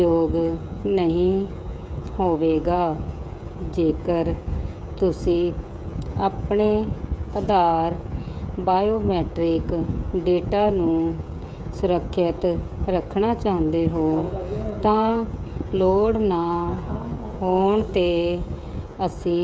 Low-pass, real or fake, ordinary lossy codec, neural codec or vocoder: none; fake; none; codec, 16 kHz, 16 kbps, FreqCodec, smaller model